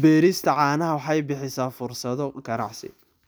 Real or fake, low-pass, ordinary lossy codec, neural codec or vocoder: real; none; none; none